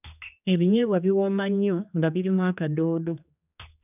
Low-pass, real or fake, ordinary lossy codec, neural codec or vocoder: 3.6 kHz; fake; none; codec, 16 kHz, 2 kbps, X-Codec, HuBERT features, trained on general audio